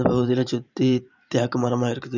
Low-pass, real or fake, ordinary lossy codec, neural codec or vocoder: 7.2 kHz; fake; none; vocoder, 44.1 kHz, 128 mel bands every 512 samples, BigVGAN v2